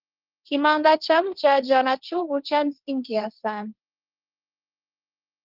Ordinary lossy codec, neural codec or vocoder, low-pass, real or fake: Opus, 32 kbps; codec, 16 kHz, 1.1 kbps, Voila-Tokenizer; 5.4 kHz; fake